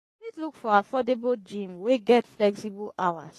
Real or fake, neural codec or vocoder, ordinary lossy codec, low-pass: fake; codec, 44.1 kHz, 3.4 kbps, Pupu-Codec; AAC, 48 kbps; 14.4 kHz